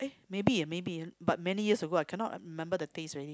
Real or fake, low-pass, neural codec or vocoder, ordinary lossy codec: real; none; none; none